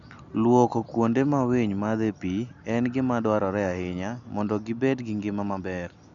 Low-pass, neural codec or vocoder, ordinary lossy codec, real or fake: 7.2 kHz; none; none; real